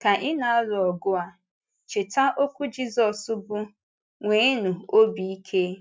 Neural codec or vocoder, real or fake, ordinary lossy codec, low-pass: none; real; none; none